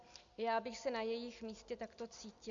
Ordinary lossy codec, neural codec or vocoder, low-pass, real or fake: MP3, 64 kbps; none; 7.2 kHz; real